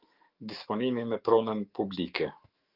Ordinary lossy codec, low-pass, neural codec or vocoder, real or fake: Opus, 32 kbps; 5.4 kHz; autoencoder, 48 kHz, 128 numbers a frame, DAC-VAE, trained on Japanese speech; fake